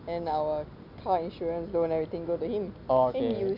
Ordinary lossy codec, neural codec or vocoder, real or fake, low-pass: none; none; real; 5.4 kHz